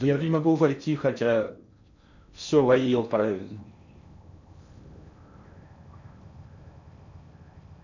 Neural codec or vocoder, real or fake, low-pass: codec, 16 kHz in and 24 kHz out, 0.6 kbps, FocalCodec, streaming, 4096 codes; fake; 7.2 kHz